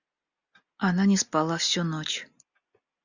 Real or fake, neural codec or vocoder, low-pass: real; none; 7.2 kHz